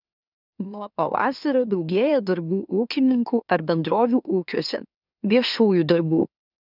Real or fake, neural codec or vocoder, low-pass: fake; autoencoder, 44.1 kHz, a latent of 192 numbers a frame, MeloTTS; 5.4 kHz